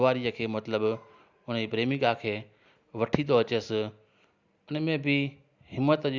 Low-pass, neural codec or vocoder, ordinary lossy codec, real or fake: 7.2 kHz; none; Opus, 64 kbps; real